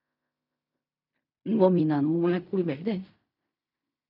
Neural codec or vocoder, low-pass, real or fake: codec, 16 kHz in and 24 kHz out, 0.4 kbps, LongCat-Audio-Codec, fine tuned four codebook decoder; 5.4 kHz; fake